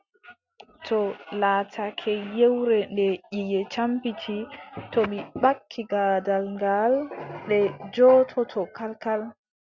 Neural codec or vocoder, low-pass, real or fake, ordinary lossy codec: none; 7.2 kHz; real; Opus, 64 kbps